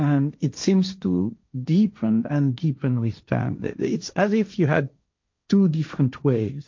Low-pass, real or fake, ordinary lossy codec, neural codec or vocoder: 7.2 kHz; fake; MP3, 48 kbps; codec, 16 kHz, 1.1 kbps, Voila-Tokenizer